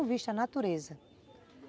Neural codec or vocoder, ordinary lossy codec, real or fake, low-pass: none; none; real; none